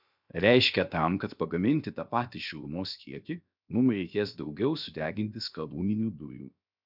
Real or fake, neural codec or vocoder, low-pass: fake; codec, 16 kHz, 0.7 kbps, FocalCodec; 5.4 kHz